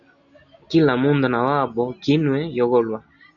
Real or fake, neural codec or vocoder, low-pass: real; none; 7.2 kHz